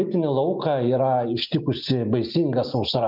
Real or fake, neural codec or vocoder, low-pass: real; none; 5.4 kHz